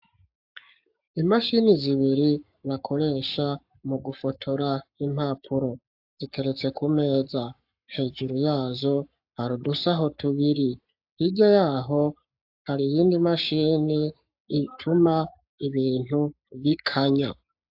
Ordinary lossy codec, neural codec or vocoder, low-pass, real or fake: AAC, 48 kbps; codec, 44.1 kHz, 7.8 kbps, Pupu-Codec; 5.4 kHz; fake